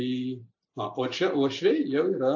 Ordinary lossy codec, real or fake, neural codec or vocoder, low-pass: AAC, 48 kbps; real; none; 7.2 kHz